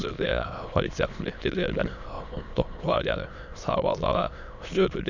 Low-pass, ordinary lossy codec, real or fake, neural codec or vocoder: 7.2 kHz; none; fake; autoencoder, 22.05 kHz, a latent of 192 numbers a frame, VITS, trained on many speakers